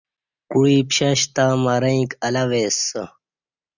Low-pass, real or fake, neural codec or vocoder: 7.2 kHz; real; none